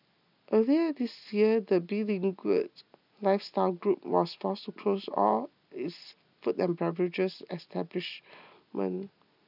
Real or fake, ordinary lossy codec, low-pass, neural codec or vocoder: real; none; 5.4 kHz; none